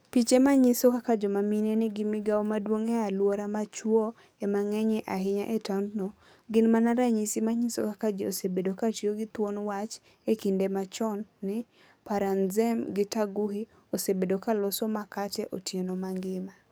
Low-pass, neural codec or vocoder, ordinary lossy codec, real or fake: none; codec, 44.1 kHz, 7.8 kbps, DAC; none; fake